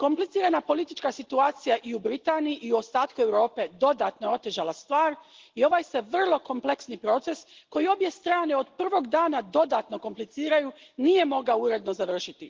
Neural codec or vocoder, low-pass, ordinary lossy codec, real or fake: none; 7.2 kHz; Opus, 16 kbps; real